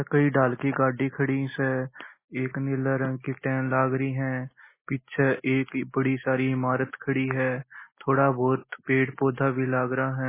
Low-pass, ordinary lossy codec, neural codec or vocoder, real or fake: 3.6 kHz; MP3, 16 kbps; none; real